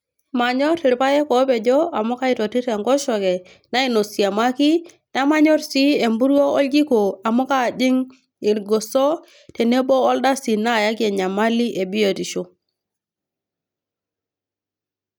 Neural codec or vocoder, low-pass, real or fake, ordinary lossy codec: none; none; real; none